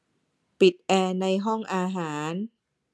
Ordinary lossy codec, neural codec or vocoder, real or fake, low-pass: none; none; real; none